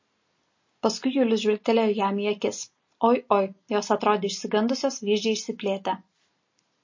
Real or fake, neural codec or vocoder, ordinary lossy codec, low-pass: real; none; MP3, 32 kbps; 7.2 kHz